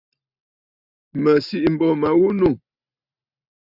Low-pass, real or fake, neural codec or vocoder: 5.4 kHz; real; none